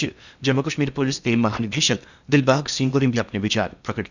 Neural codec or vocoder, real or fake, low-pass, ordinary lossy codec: codec, 16 kHz in and 24 kHz out, 0.8 kbps, FocalCodec, streaming, 65536 codes; fake; 7.2 kHz; none